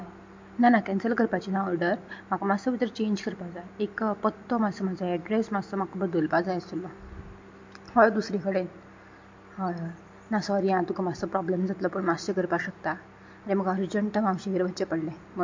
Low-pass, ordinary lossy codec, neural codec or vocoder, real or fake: 7.2 kHz; MP3, 48 kbps; none; real